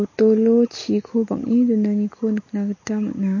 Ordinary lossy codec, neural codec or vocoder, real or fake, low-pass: MP3, 32 kbps; none; real; 7.2 kHz